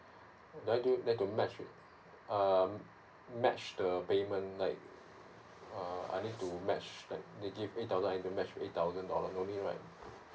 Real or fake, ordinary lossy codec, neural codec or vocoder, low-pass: real; none; none; none